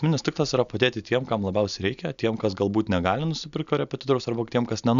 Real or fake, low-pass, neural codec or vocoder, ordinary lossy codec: real; 7.2 kHz; none; Opus, 64 kbps